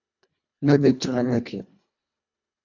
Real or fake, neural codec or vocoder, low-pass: fake; codec, 24 kHz, 1.5 kbps, HILCodec; 7.2 kHz